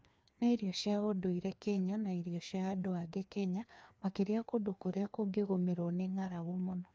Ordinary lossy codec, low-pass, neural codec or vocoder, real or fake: none; none; codec, 16 kHz, 2 kbps, FreqCodec, larger model; fake